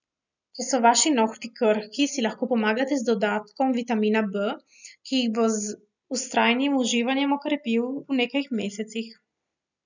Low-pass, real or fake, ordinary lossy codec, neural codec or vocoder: 7.2 kHz; real; none; none